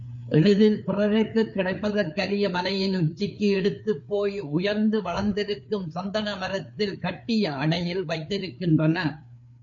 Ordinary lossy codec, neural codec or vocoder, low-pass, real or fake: MP3, 64 kbps; codec, 16 kHz, 4 kbps, FreqCodec, larger model; 7.2 kHz; fake